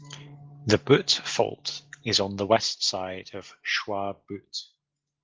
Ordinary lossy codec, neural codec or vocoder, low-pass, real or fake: Opus, 16 kbps; none; 7.2 kHz; real